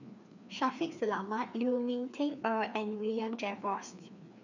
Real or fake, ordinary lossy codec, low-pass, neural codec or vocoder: fake; none; 7.2 kHz; codec, 16 kHz, 2 kbps, FreqCodec, larger model